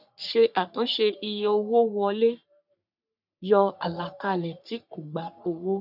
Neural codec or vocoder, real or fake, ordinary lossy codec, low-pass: codec, 44.1 kHz, 3.4 kbps, Pupu-Codec; fake; none; 5.4 kHz